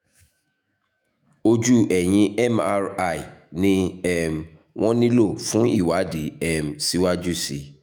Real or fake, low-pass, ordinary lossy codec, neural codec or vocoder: fake; none; none; autoencoder, 48 kHz, 128 numbers a frame, DAC-VAE, trained on Japanese speech